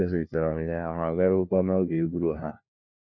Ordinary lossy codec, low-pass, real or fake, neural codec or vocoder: none; 7.2 kHz; fake; codec, 16 kHz, 1 kbps, FunCodec, trained on LibriTTS, 50 frames a second